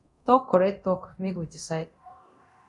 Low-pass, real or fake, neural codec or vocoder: 10.8 kHz; fake; codec, 24 kHz, 0.9 kbps, DualCodec